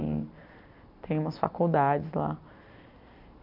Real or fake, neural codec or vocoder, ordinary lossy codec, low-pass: real; none; MP3, 48 kbps; 5.4 kHz